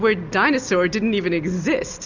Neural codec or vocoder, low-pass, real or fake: none; 7.2 kHz; real